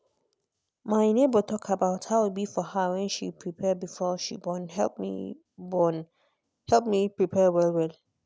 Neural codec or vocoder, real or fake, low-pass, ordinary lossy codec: none; real; none; none